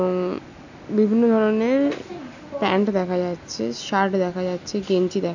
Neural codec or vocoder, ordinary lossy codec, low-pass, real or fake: none; none; 7.2 kHz; real